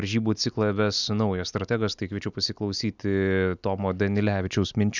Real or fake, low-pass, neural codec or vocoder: real; 7.2 kHz; none